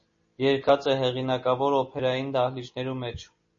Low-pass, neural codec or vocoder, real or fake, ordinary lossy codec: 7.2 kHz; none; real; MP3, 32 kbps